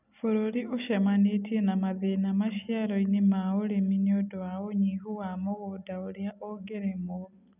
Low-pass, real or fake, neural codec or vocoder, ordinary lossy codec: 3.6 kHz; real; none; none